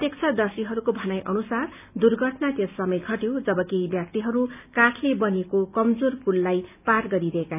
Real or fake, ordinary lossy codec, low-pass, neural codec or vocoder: real; none; 3.6 kHz; none